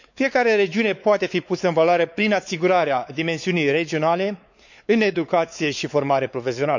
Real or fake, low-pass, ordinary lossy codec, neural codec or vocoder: fake; 7.2 kHz; none; codec, 16 kHz, 4 kbps, X-Codec, WavLM features, trained on Multilingual LibriSpeech